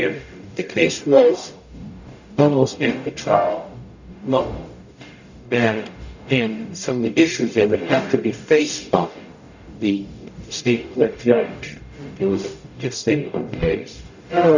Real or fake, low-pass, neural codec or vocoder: fake; 7.2 kHz; codec, 44.1 kHz, 0.9 kbps, DAC